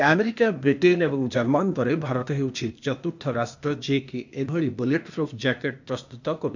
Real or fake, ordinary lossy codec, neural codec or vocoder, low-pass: fake; none; codec, 16 kHz, 0.8 kbps, ZipCodec; 7.2 kHz